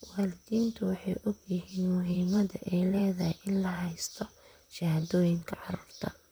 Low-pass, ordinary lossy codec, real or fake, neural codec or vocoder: none; none; fake; vocoder, 44.1 kHz, 128 mel bands, Pupu-Vocoder